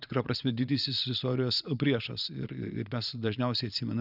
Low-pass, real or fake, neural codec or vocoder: 5.4 kHz; fake; vocoder, 22.05 kHz, 80 mel bands, Vocos